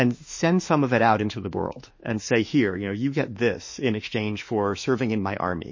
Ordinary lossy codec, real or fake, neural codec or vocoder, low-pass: MP3, 32 kbps; fake; autoencoder, 48 kHz, 32 numbers a frame, DAC-VAE, trained on Japanese speech; 7.2 kHz